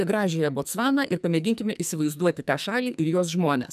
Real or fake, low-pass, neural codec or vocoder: fake; 14.4 kHz; codec, 44.1 kHz, 2.6 kbps, SNAC